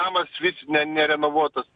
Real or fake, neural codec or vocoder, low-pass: real; none; 9.9 kHz